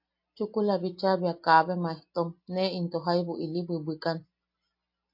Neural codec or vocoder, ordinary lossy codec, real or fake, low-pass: none; MP3, 32 kbps; real; 5.4 kHz